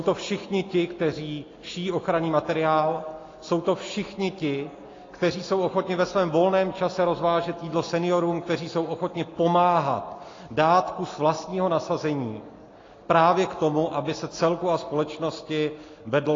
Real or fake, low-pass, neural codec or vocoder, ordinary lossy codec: real; 7.2 kHz; none; AAC, 32 kbps